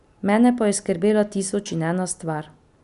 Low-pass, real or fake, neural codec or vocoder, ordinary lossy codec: 10.8 kHz; real; none; none